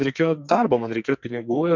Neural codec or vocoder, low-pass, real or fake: codec, 44.1 kHz, 2.6 kbps, SNAC; 7.2 kHz; fake